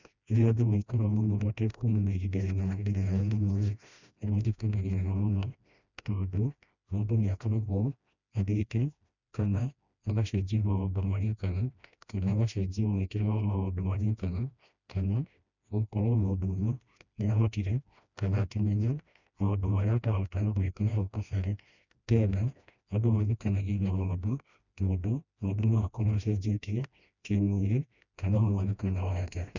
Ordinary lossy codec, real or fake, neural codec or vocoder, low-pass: none; fake; codec, 16 kHz, 1 kbps, FreqCodec, smaller model; 7.2 kHz